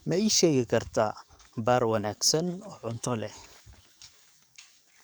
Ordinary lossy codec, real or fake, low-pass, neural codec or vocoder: none; fake; none; codec, 44.1 kHz, 7.8 kbps, DAC